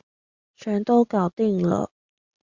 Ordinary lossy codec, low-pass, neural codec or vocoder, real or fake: Opus, 64 kbps; 7.2 kHz; none; real